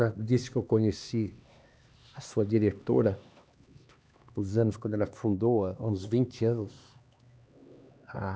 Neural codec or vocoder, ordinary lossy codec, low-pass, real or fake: codec, 16 kHz, 2 kbps, X-Codec, HuBERT features, trained on LibriSpeech; none; none; fake